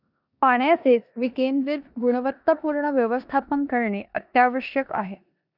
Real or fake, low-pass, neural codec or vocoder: fake; 5.4 kHz; codec, 16 kHz in and 24 kHz out, 0.9 kbps, LongCat-Audio-Codec, four codebook decoder